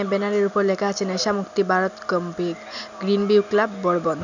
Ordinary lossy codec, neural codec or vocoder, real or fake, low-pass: none; none; real; 7.2 kHz